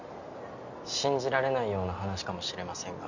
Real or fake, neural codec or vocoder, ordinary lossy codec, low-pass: real; none; none; 7.2 kHz